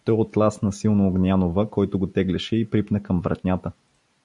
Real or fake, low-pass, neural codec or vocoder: real; 10.8 kHz; none